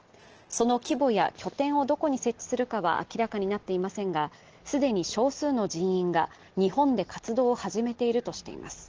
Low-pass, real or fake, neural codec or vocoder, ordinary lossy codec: 7.2 kHz; real; none; Opus, 16 kbps